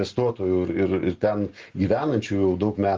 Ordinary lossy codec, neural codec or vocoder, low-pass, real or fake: Opus, 16 kbps; none; 7.2 kHz; real